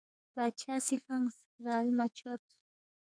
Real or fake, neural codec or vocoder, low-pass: fake; codec, 44.1 kHz, 2.6 kbps, SNAC; 9.9 kHz